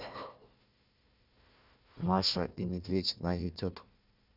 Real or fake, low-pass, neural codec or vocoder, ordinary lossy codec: fake; 5.4 kHz; codec, 16 kHz, 1 kbps, FunCodec, trained on Chinese and English, 50 frames a second; none